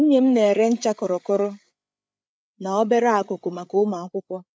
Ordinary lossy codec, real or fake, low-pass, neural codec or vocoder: none; fake; none; codec, 16 kHz, 8 kbps, FreqCodec, larger model